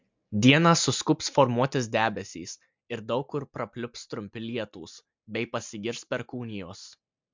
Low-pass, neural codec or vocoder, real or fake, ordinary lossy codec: 7.2 kHz; none; real; MP3, 64 kbps